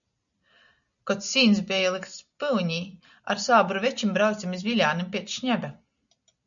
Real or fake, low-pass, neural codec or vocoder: real; 7.2 kHz; none